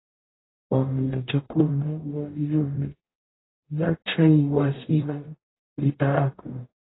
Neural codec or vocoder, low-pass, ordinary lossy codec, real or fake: codec, 44.1 kHz, 0.9 kbps, DAC; 7.2 kHz; AAC, 16 kbps; fake